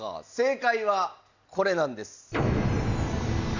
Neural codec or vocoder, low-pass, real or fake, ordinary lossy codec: none; 7.2 kHz; real; Opus, 64 kbps